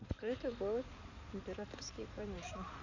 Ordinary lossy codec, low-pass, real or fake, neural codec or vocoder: none; 7.2 kHz; fake; codec, 16 kHz in and 24 kHz out, 2.2 kbps, FireRedTTS-2 codec